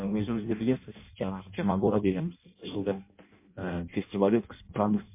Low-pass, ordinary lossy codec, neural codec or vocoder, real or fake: 3.6 kHz; MP3, 24 kbps; codec, 16 kHz in and 24 kHz out, 0.6 kbps, FireRedTTS-2 codec; fake